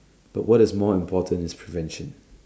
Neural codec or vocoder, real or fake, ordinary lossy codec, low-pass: none; real; none; none